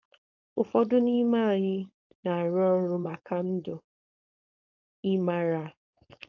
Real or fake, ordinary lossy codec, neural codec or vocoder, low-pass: fake; AAC, 48 kbps; codec, 16 kHz, 4.8 kbps, FACodec; 7.2 kHz